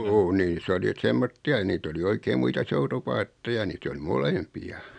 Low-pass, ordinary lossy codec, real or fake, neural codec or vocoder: 9.9 kHz; none; real; none